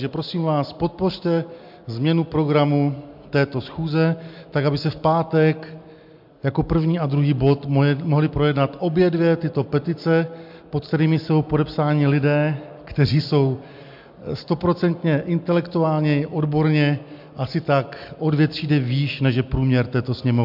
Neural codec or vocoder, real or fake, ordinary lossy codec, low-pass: none; real; MP3, 48 kbps; 5.4 kHz